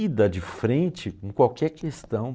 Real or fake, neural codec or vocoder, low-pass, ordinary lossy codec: real; none; none; none